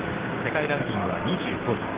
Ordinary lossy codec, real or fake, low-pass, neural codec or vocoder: Opus, 16 kbps; fake; 3.6 kHz; codec, 16 kHz, 6 kbps, DAC